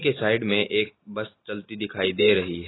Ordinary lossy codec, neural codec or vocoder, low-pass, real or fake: AAC, 16 kbps; none; 7.2 kHz; real